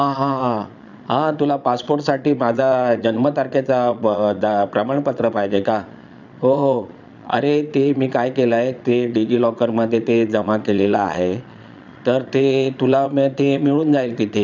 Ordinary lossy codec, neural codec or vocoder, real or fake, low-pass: none; vocoder, 22.05 kHz, 80 mel bands, Vocos; fake; 7.2 kHz